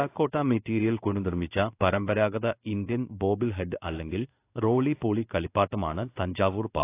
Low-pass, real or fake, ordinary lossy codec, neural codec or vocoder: 3.6 kHz; fake; AAC, 24 kbps; codec, 16 kHz in and 24 kHz out, 1 kbps, XY-Tokenizer